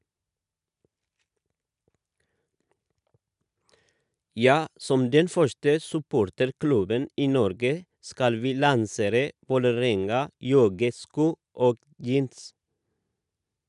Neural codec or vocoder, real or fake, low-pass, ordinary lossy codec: none; real; 10.8 kHz; none